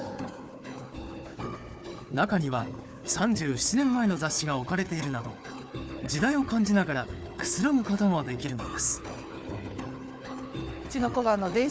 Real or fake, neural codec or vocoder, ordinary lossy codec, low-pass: fake; codec, 16 kHz, 4 kbps, FunCodec, trained on Chinese and English, 50 frames a second; none; none